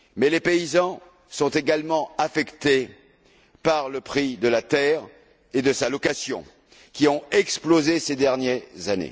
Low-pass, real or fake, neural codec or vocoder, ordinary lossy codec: none; real; none; none